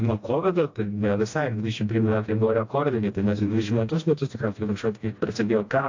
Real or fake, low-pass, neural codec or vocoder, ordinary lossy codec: fake; 7.2 kHz; codec, 16 kHz, 1 kbps, FreqCodec, smaller model; AAC, 48 kbps